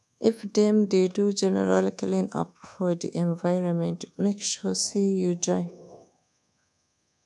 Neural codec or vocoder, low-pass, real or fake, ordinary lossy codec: codec, 24 kHz, 1.2 kbps, DualCodec; none; fake; none